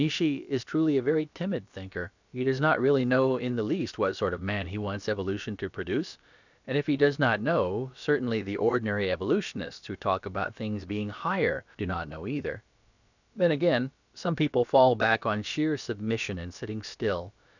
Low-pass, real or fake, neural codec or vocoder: 7.2 kHz; fake; codec, 16 kHz, about 1 kbps, DyCAST, with the encoder's durations